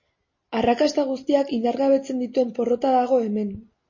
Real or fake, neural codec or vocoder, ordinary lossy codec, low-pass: real; none; MP3, 32 kbps; 7.2 kHz